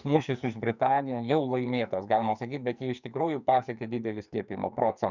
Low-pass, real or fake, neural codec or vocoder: 7.2 kHz; fake; codec, 44.1 kHz, 2.6 kbps, SNAC